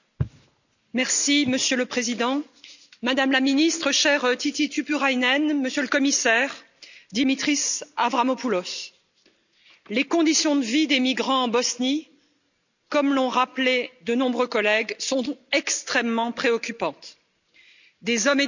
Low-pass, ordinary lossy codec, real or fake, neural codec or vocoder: 7.2 kHz; none; real; none